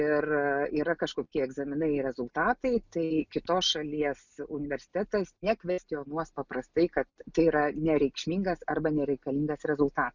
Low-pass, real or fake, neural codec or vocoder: 7.2 kHz; real; none